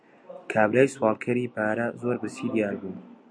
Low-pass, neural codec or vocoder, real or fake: 9.9 kHz; none; real